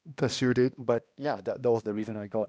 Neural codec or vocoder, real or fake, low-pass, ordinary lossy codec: codec, 16 kHz, 1 kbps, X-Codec, HuBERT features, trained on balanced general audio; fake; none; none